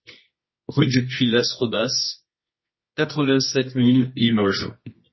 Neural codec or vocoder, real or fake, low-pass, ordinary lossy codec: codec, 24 kHz, 0.9 kbps, WavTokenizer, medium music audio release; fake; 7.2 kHz; MP3, 24 kbps